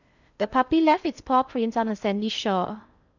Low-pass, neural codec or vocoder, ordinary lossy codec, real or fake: 7.2 kHz; codec, 16 kHz in and 24 kHz out, 0.6 kbps, FocalCodec, streaming, 2048 codes; none; fake